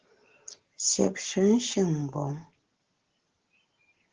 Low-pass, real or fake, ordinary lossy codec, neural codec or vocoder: 7.2 kHz; real; Opus, 16 kbps; none